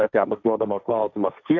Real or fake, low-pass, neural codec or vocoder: fake; 7.2 kHz; codec, 16 kHz, 1.1 kbps, Voila-Tokenizer